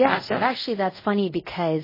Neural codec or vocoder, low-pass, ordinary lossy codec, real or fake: codec, 24 kHz, 0.5 kbps, DualCodec; 5.4 kHz; MP3, 24 kbps; fake